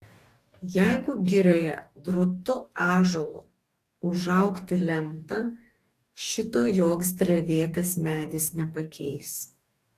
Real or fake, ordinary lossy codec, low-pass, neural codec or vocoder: fake; AAC, 64 kbps; 14.4 kHz; codec, 44.1 kHz, 2.6 kbps, DAC